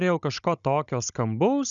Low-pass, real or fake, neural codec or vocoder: 7.2 kHz; fake; codec, 16 kHz, 16 kbps, FunCodec, trained on Chinese and English, 50 frames a second